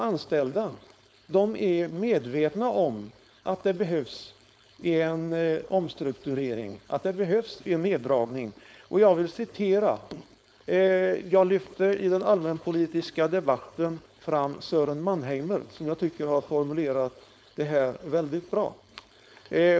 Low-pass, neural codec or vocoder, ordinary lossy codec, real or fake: none; codec, 16 kHz, 4.8 kbps, FACodec; none; fake